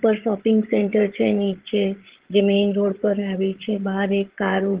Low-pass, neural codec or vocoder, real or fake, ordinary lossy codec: 3.6 kHz; codec, 16 kHz, 16 kbps, FreqCodec, larger model; fake; Opus, 16 kbps